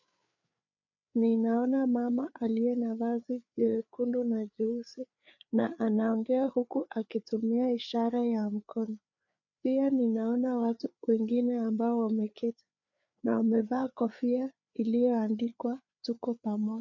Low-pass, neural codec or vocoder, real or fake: 7.2 kHz; codec, 16 kHz, 4 kbps, FreqCodec, larger model; fake